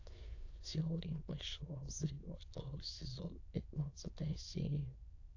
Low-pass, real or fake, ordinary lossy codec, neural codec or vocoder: 7.2 kHz; fake; AAC, 48 kbps; autoencoder, 22.05 kHz, a latent of 192 numbers a frame, VITS, trained on many speakers